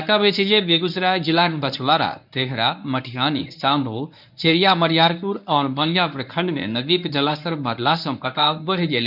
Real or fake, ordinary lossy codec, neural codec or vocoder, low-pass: fake; none; codec, 24 kHz, 0.9 kbps, WavTokenizer, medium speech release version 1; 5.4 kHz